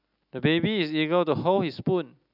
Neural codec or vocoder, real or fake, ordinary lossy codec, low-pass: none; real; none; 5.4 kHz